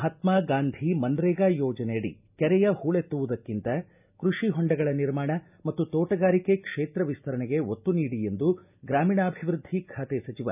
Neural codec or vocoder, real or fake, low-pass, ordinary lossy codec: none; real; 3.6 kHz; none